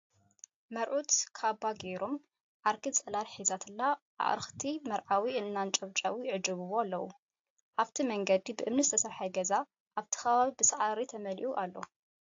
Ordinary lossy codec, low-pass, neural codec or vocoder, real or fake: AAC, 48 kbps; 7.2 kHz; none; real